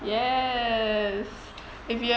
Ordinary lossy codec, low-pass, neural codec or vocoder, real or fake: none; none; none; real